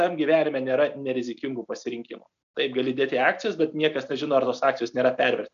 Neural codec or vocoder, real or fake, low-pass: none; real; 7.2 kHz